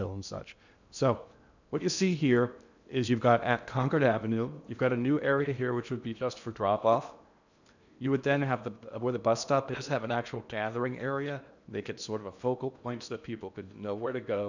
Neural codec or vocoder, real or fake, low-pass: codec, 16 kHz in and 24 kHz out, 0.8 kbps, FocalCodec, streaming, 65536 codes; fake; 7.2 kHz